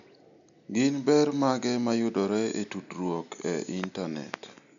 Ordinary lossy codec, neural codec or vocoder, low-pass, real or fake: none; none; 7.2 kHz; real